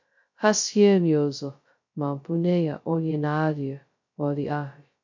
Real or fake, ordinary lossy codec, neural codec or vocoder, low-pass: fake; MP3, 48 kbps; codec, 16 kHz, 0.2 kbps, FocalCodec; 7.2 kHz